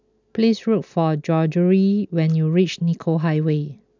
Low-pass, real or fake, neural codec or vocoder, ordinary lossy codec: 7.2 kHz; real; none; none